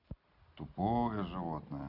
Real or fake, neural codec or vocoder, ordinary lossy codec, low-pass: real; none; none; 5.4 kHz